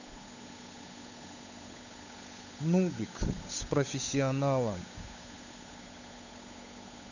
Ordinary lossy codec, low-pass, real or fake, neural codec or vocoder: none; 7.2 kHz; fake; codec, 16 kHz, 8 kbps, FunCodec, trained on Chinese and English, 25 frames a second